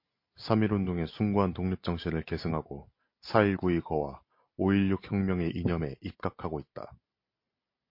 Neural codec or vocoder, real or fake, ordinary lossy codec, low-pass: none; real; MP3, 32 kbps; 5.4 kHz